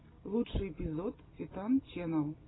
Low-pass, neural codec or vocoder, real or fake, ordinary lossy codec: 7.2 kHz; none; real; AAC, 16 kbps